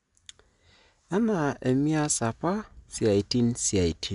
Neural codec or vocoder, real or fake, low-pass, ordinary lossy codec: none; real; 10.8 kHz; none